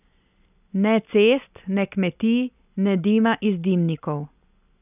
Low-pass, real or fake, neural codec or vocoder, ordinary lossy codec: 3.6 kHz; real; none; none